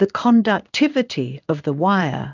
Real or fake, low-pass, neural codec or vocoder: fake; 7.2 kHz; codec, 16 kHz in and 24 kHz out, 1 kbps, XY-Tokenizer